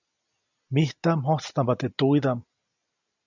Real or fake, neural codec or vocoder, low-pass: real; none; 7.2 kHz